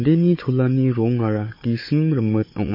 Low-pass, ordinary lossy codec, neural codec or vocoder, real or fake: 5.4 kHz; MP3, 24 kbps; codec, 16 kHz, 4 kbps, FunCodec, trained on Chinese and English, 50 frames a second; fake